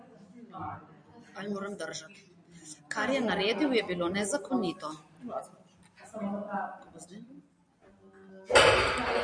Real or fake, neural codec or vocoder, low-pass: real; none; 9.9 kHz